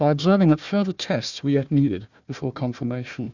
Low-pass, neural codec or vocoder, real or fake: 7.2 kHz; codec, 16 kHz, 1 kbps, FunCodec, trained on Chinese and English, 50 frames a second; fake